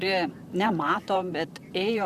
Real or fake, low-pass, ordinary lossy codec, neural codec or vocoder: fake; 14.4 kHz; Opus, 64 kbps; vocoder, 44.1 kHz, 128 mel bands, Pupu-Vocoder